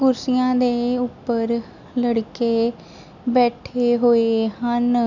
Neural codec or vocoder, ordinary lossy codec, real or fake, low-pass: none; none; real; 7.2 kHz